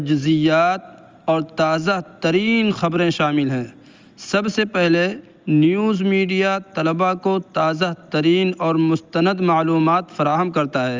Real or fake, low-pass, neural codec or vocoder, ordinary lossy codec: real; 7.2 kHz; none; Opus, 32 kbps